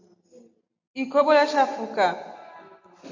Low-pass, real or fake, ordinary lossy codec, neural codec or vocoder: 7.2 kHz; real; MP3, 48 kbps; none